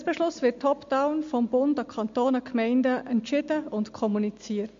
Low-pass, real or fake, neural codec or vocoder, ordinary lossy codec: 7.2 kHz; real; none; MP3, 48 kbps